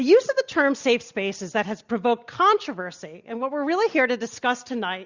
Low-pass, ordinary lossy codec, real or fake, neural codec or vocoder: 7.2 kHz; Opus, 64 kbps; real; none